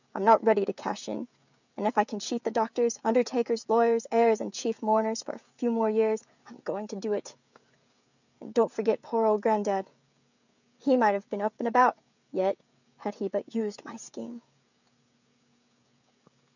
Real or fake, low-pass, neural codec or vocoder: fake; 7.2 kHz; codec, 16 kHz, 16 kbps, FreqCodec, smaller model